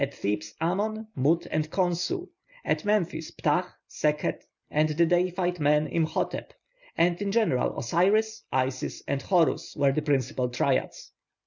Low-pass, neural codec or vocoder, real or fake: 7.2 kHz; none; real